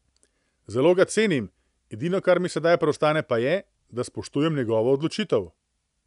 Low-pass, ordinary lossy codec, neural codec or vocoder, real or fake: 10.8 kHz; none; none; real